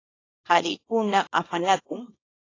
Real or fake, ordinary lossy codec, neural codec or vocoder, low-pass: fake; AAC, 32 kbps; codec, 24 kHz, 0.9 kbps, WavTokenizer, small release; 7.2 kHz